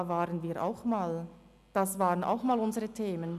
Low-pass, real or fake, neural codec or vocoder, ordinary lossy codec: 14.4 kHz; real; none; none